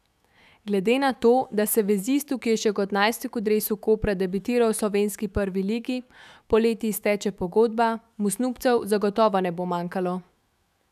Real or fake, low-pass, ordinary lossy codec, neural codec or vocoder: fake; 14.4 kHz; none; autoencoder, 48 kHz, 128 numbers a frame, DAC-VAE, trained on Japanese speech